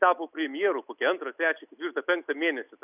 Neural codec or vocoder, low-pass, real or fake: none; 3.6 kHz; real